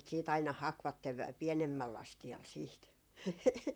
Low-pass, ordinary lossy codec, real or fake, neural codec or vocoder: none; none; fake; vocoder, 44.1 kHz, 128 mel bands, Pupu-Vocoder